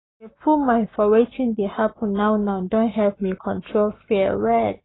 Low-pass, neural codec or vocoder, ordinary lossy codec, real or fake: 7.2 kHz; codec, 44.1 kHz, 7.8 kbps, Pupu-Codec; AAC, 16 kbps; fake